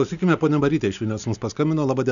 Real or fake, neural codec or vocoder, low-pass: real; none; 7.2 kHz